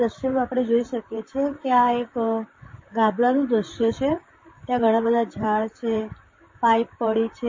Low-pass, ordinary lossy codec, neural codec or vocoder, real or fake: 7.2 kHz; MP3, 32 kbps; vocoder, 44.1 kHz, 128 mel bands, Pupu-Vocoder; fake